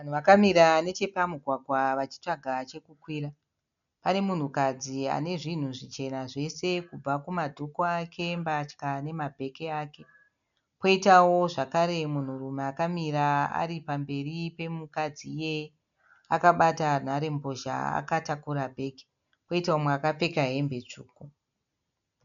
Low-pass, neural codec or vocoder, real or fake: 7.2 kHz; none; real